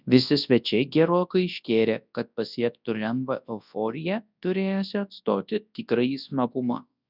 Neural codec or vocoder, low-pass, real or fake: codec, 24 kHz, 0.9 kbps, WavTokenizer, large speech release; 5.4 kHz; fake